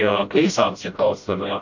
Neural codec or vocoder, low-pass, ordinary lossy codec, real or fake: codec, 16 kHz, 0.5 kbps, FreqCodec, smaller model; 7.2 kHz; AAC, 48 kbps; fake